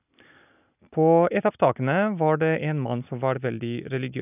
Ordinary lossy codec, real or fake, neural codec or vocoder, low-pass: none; real; none; 3.6 kHz